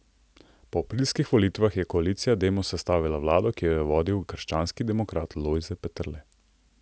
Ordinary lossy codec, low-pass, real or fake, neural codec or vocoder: none; none; real; none